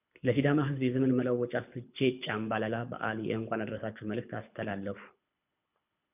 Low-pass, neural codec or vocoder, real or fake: 3.6 kHz; codec, 24 kHz, 6 kbps, HILCodec; fake